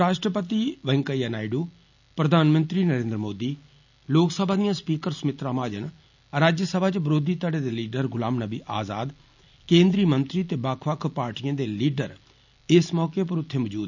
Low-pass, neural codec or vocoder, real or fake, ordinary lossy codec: 7.2 kHz; none; real; none